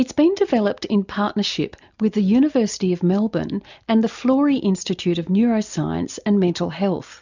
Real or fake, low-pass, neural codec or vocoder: real; 7.2 kHz; none